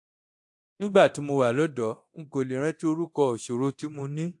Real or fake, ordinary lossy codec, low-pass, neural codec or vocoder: fake; AAC, 64 kbps; 10.8 kHz; codec, 24 kHz, 0.9 kbps, DualCodec